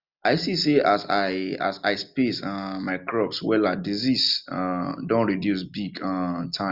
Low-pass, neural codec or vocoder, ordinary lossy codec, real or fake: 5.4 kHz; none; Opus, 64 kbps; real